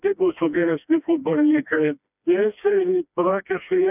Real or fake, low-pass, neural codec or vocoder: fake; 3.6 kHz; codec, 16 kHz, 1 kbps, FreqCodec, smaller model